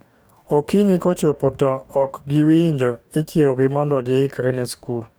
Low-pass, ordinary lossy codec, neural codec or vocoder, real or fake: none; none; codec, 44.1 kHz, 2.6 kbps, DAC; fake